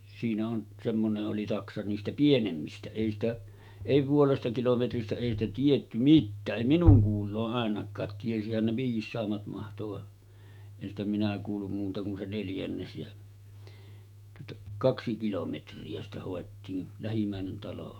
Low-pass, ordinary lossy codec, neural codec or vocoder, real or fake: 19.8 kHz; none; autoencoder, 48 kHz, 128 numbers a frame, DAC-VAE, trained on Japanese speech; fake